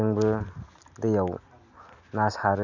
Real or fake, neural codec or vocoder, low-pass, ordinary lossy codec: real; none; 7.2 kHz; none